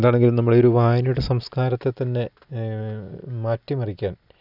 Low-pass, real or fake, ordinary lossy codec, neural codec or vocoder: 5.4 kHz; real; none; none